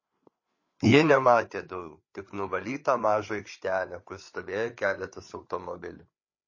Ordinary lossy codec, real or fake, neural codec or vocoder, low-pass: MP3, 32 kbps; fake; codec, 16 kHz, 8 kbps, FreqCodec, larger model; 7.2 kHz